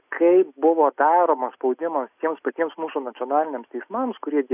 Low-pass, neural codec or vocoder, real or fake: 3.6 kHz; none; real